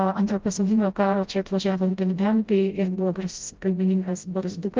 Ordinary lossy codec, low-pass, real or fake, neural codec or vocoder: Opus, 32 kbps; 7.2 kHz; fake; codec, 16 kHz, 0.5 kbps, FreqCodec, smaller model